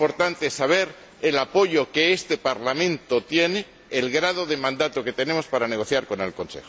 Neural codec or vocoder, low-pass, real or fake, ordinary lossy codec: none; none; real; none